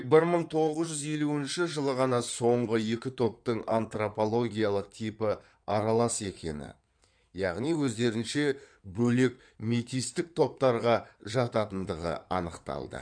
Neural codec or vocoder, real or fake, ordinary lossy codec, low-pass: codec, 16 kHz in and 24 kHz out, 2.2 kbps, FireRedTTS-2 codec; fake; none; 9.9 kHz